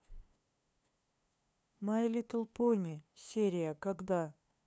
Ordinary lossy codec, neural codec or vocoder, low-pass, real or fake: none; codec, 16 kHz, 2 kbps, FunCodec, trained on LibriTTS, 25 frames a second; none; fake